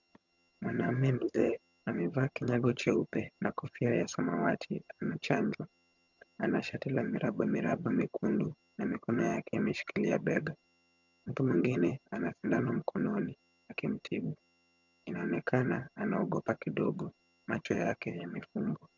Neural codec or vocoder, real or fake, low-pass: vocoder, 22.05 kHz, 80 mel bands, HiFi-GAN; fake; 7.2 kHz